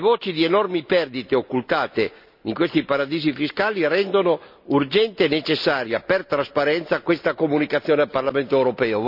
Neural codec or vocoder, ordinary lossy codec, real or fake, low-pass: none; none; real; 5.4 kHz